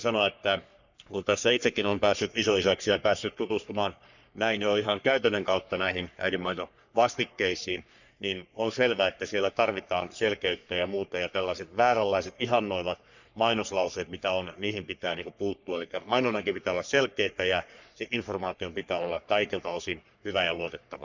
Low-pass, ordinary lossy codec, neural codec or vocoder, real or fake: 7.2 kHz; none; codec, 44.1 kHz, 3.4 kbps, Pupu-Codec; fake